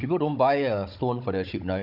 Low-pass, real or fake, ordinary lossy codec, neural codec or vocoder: 5.4 kHz; fake; none; codec, 16 kHz, 16 kbps, FunCodec, trained on Chinese and English, 50 frames a second